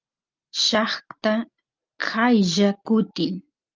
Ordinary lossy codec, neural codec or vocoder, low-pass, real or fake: Opus, 24 kbps; none; 7.2 kHz; real